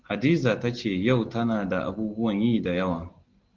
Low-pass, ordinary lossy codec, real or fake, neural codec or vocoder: 7.2 kHz; Opus, 16 kbps; real; none